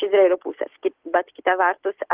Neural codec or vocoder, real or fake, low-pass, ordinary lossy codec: none; real; 3.6 kHz; Opus, 16 kbps